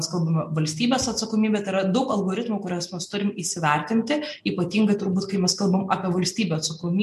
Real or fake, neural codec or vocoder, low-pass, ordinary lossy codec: real; none; 14.4 kHz; MP3, 64 kbps